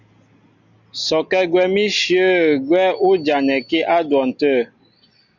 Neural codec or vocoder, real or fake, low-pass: none; real; 7.2 kHz